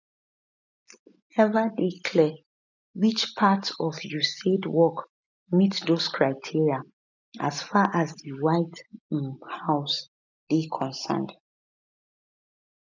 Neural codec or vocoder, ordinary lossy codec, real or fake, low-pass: none; none; real; 7.2 kHz